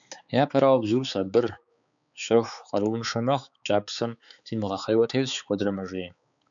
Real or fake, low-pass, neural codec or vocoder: fake; 7.2 kHz; codec, 16 kHz, 4 kbps, X-Codec, HuBERT features, trained on balanced general audio